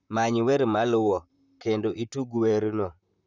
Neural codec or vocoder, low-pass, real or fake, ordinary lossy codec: vocoder, 44.1 kHz, 128 mel bands, Pupu-Vocoder; 7.2 kHz; fake; none